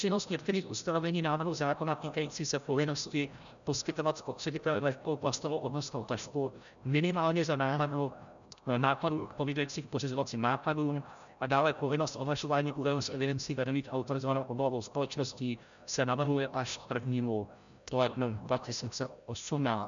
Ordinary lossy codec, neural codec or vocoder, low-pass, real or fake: MP3, 96 kbps; codec, 16 kHz, 0.5 kbps, FreqCodec, larger model; 7.2 kHz; fake